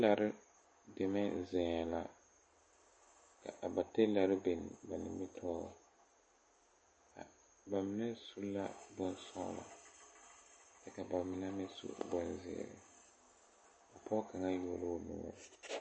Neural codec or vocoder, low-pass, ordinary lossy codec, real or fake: none; 9.9 kHz; MP3, 32 kbps; real